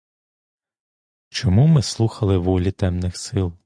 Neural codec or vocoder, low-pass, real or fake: none; 9.9 kHz; real